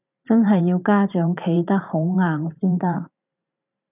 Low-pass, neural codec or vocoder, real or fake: 3.6 kHz; vocoder, 44.1 kHz, 128 mel bands every 512 samples, BigVGAN v2; fake